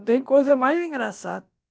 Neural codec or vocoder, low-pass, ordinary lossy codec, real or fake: codec, 16 kHz, about 1 kbps, DyCAST, with the encoder's durations; none; none; fake